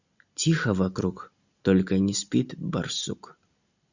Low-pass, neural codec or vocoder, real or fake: 7.2 kHz; none; real